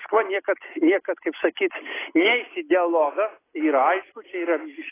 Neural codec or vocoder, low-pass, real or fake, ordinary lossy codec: none; 3.6 kHz; real; AAC, 16 kbps